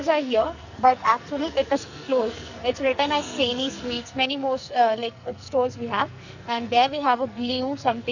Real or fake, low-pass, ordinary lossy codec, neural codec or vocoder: fake; 7.2 kHz; none; codec, 44.1 kHz, 2.6 kbps, SNAC